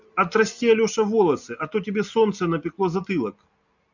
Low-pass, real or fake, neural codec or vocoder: 7.2 kHz; real; none